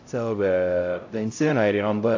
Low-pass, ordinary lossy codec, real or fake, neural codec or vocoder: 7.2 kHz; AAC, 48 kbps; fake; codec, 16 kHz, 0.5 kbps, X-Codec, HuBERT features, trained on LibriSpeech